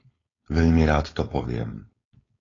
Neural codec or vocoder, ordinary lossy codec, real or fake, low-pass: codec, 16 kHz, 4.8 kbps, FACodec; AAC, 32 kbps; fake; 7.2 kHz